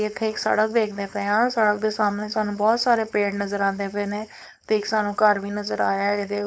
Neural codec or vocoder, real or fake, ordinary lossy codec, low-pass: codec, 16 kHz, 4.8 kbps, FACodec; fake; none; none